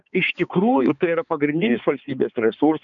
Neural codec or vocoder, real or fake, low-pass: codec, 16 kHz, 4 kbps, X-Codec, HuBERT features, trained on balanced general audio; fake; 7.2 kHz